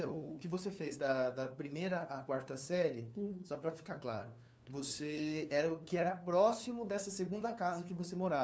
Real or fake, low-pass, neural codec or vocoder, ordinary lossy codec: fake; none; codec, 16 kHz, 2 kbps, FunCodec, trained on LibriTTS, 25 frames a second; none